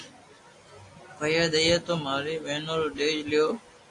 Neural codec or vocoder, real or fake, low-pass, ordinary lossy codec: none; real; 10.8 kHz; AAC, 48 kbps